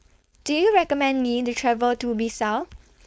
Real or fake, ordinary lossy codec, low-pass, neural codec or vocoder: fake; none; none; codec, 16 kHz, 4.8 kbps, FACodec